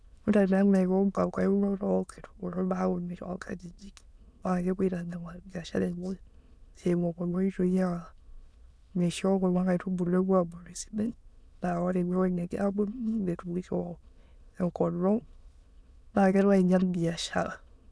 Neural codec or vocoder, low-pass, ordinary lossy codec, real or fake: autoencoder, 22.05 kHz, a latent of 192 numbers a frame, VITS, trained on many speakers; none; none; fake